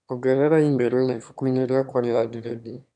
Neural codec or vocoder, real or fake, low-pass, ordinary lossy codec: autoencoder, 22.05 kHz, a latent of 192 numbers a frame, VITS, trained on one speaker; fake; 9.9 kHz; none